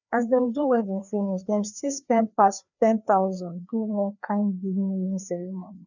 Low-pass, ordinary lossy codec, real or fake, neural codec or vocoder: 7.2 kHz; none; fake; codec, 16 kHz, 2 kbps, FreqCodec, larger model